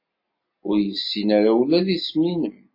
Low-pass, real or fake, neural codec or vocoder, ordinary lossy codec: 5.4 kHz; real; none; MP3, 24 kbps